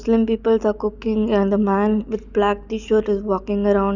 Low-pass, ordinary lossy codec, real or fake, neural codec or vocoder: 7.2 kHz; none; real; none